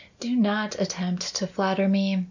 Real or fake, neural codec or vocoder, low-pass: real; none; 7.2 kHz